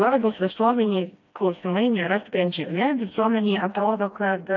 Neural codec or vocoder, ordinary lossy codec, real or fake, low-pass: codec, 16 kHz, 1 kbps, FreqCodec, smaller model; AAC, 48 kbps; fake; 7.2 kHz